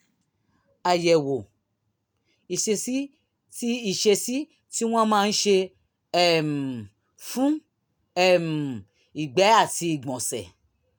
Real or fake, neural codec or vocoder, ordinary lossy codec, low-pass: real; none; none; none